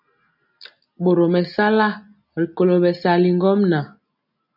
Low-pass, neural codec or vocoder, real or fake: 5.4 kHz; none; real